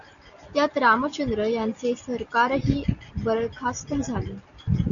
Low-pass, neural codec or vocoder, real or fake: 7.2 kHz; none; real